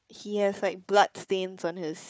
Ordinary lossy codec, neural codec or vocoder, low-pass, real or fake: none; codec, 16 kHz, 4 kbps, FunCodec, trained on Chinese and English, 50 frames a second; none; fake